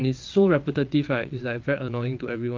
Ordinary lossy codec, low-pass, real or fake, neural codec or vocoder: Opus, 24 kbps; 7.2 kHz; fake; vocoder, 22.05 kHz, 80 mel bands, WaveNeXt